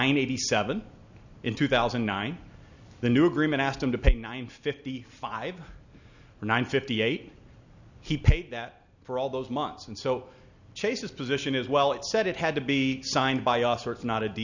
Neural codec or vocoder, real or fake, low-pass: none; real; 7.2 kHz